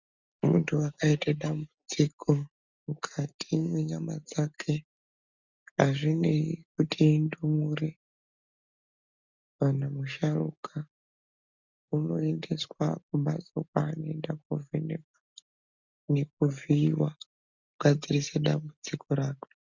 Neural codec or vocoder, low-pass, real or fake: none; 7.2 kHz; real